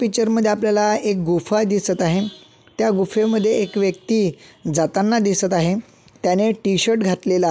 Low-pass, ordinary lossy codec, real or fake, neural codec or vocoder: none; none; real; none